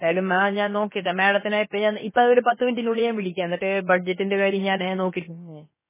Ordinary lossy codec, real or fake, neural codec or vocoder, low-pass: MP3, 16 kbps; fake; codec, 16 kHz, about 1 kbps, DyCAST, with the encoder's durations; 3.6 kHz